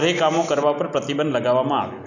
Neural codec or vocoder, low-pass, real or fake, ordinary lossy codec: none; 7.2 kHz; real; none